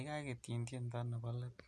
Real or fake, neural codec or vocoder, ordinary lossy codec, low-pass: real; none; none; none